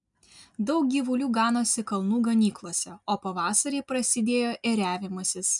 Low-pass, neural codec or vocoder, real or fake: 10.8 kHz; none; real